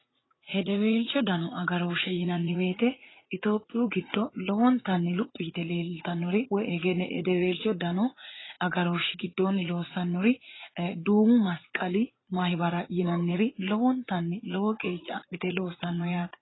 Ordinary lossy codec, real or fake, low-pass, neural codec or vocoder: AAC, 16 kbps; fake; 7.2 kHz; vocoder, 44.1 kHz, 80 mel bands, Vocos